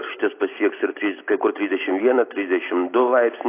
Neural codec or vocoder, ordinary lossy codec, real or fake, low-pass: none; AAC, 24 kbps; real; 3.6 kHz